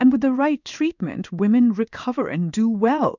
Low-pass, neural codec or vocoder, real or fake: 7.2 kHz; codec, 16 kHz in and 24 kHz out, 1 kbps, XY-Tokenizer; fake